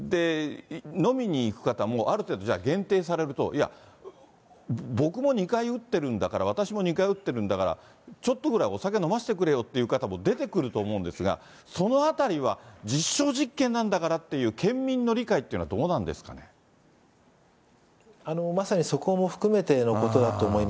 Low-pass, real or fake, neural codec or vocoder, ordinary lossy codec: none; real; none; none